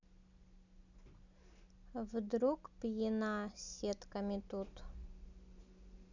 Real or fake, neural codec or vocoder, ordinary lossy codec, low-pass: real; none; none; 7.2 kHz